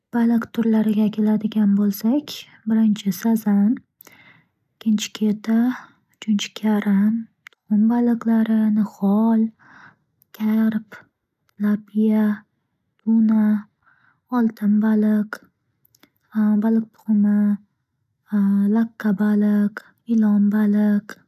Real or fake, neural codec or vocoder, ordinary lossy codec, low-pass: real; none; none; 14.4 kHz